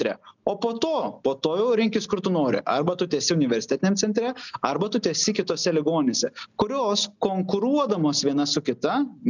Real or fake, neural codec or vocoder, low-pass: real; none; 7.2 kHz